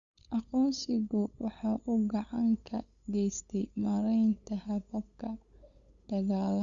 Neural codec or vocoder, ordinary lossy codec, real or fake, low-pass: codec, 16 kHz, 8 kbps, FunCodec, trained on LibriTTS, 25 frames a second; Opus, 64 kbps; fake; 7.2 kHz